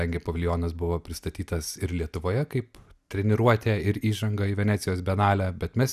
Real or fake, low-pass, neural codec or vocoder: real; 14.4 kHz; none